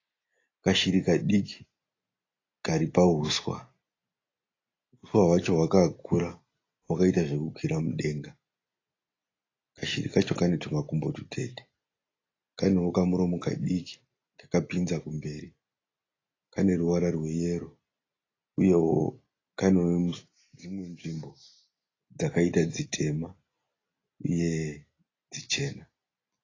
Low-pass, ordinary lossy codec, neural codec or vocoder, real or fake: 7.2 kHz; AAC, 32 kbps; none; real